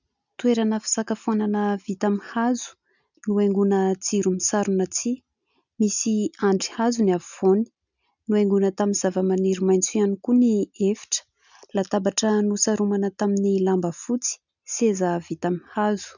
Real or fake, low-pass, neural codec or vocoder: real; 7.2 kHz; none